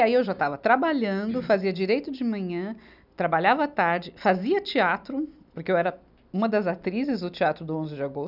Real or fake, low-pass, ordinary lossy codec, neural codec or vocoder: real; 5.4 kHz; none; none